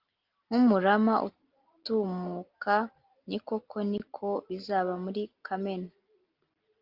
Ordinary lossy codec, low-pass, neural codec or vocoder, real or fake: Opus, 24 kbps; 5.4 kHz; none; real